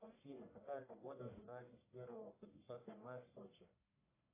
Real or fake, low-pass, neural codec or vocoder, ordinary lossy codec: fake; 3.6 kHz; codec, 44.1 kHz, 1.7 kbps, Pupu-Codec; AAC, 24 kbps